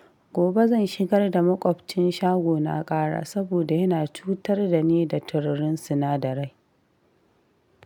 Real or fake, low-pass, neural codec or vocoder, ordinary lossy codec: real; 19.8 kHz; none; none